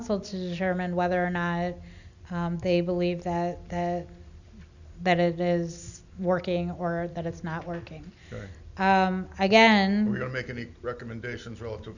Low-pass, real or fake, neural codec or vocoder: 7.2 kHz; real; none